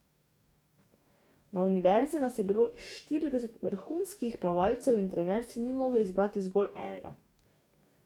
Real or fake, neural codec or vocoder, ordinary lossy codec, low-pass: fake; codec, 44.1 kHz, 2.6 kbps, DAC; none; 19.8 kHz